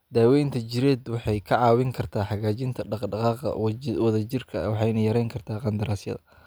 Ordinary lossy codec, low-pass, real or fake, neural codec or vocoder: none; none; real; none